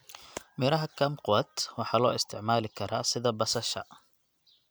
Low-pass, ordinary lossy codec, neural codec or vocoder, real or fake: none; none; none; real